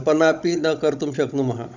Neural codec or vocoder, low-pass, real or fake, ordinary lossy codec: codec, 16 kHz, 16 kbps, FunCodec, trained on Chinese and English, 50 frames a second; 7.2 kHz; fake; none